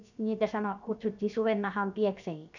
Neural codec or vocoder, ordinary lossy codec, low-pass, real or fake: codec, 16 kHz, about 1 kbps, DyCAST, with the encoder's durations; none; 7.2 kHz; fake